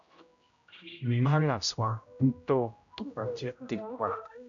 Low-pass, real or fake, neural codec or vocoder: 7.2 kHz; fake; codec, 16 kHz, 0.5 kbps, X-Codec, HuBERT features, trained on general audio